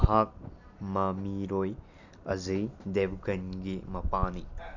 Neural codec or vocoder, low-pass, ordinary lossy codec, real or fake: none; 7.2 kHz; none; real